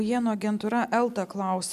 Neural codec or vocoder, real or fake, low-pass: none; real; 14.4 kHz